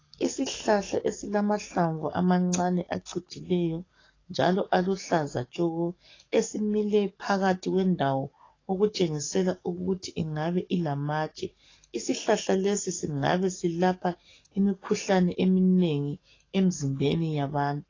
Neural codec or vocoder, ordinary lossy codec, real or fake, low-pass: codec, 44.1 kHz, 7.8 kbps, DAC; AAC, 32 kbps; fake; 7.2 kHz